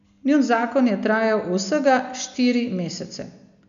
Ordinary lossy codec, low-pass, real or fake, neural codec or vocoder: AAC, 96 kbps; 7.2 kHz; real; none